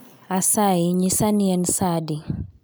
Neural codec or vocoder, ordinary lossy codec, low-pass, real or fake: none; none; none; real